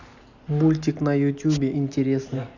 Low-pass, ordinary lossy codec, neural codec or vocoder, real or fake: 7.2 kHz; none; none; real